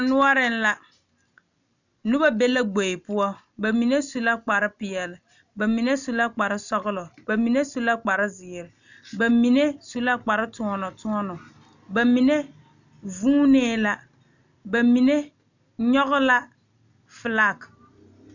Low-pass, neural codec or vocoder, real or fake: 7.2 kHz; none; real